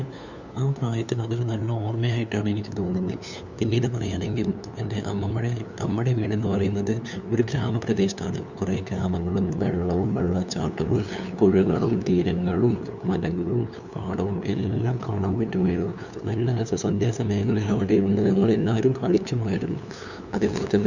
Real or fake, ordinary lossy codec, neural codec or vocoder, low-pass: fake; none; codec, 16 kHz, 2 kbps, FunCodec, trained on LibriTTS, 25 frames a second; 7.2 kHz